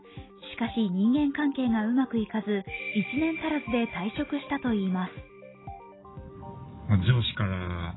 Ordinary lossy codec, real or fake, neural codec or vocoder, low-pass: AAC, 16 kbps; real; none; 7.2 kHz